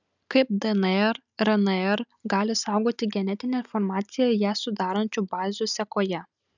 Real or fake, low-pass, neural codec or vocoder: real; 7.2 kHz; none